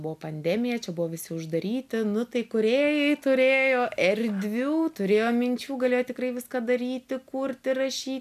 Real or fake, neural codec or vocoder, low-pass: real; none; 14.4 kHz